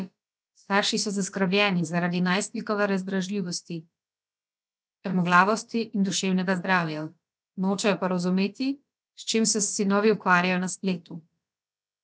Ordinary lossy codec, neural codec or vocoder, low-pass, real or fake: none; codec, 16 kHz, about 1 kbps, DyCAST, with the encoder's durations; none; fake